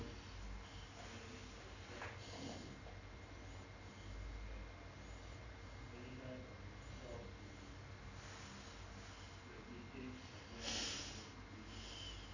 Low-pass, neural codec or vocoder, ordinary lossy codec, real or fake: 7.2 kHz; none; none; real